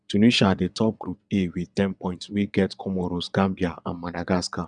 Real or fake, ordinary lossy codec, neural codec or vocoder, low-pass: fake; none; vocoder, 22.05 kHz, 80 mel bands, WaveNeXt; 9.9 kHz